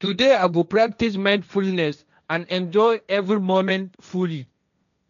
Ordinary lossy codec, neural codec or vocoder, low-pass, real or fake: none; codec, 16 kHz, 1.1 kbps, Voila-Tokenizer; 7.2 kHz; fake